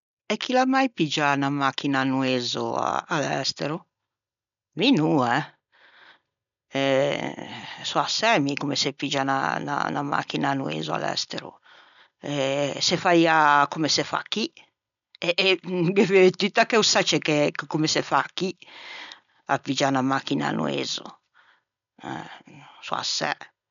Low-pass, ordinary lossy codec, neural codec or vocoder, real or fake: 7.2 kHz; none; none; real